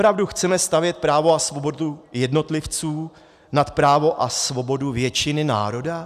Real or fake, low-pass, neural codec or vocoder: real; 14.4 kHz; none